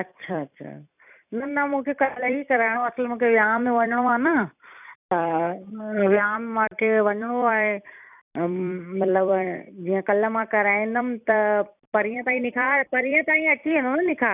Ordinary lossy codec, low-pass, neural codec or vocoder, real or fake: none; 3.6 kHz; vocoder, 44.1 kHz, 128 mel bands every 256 samples, BigVGAN v2; fake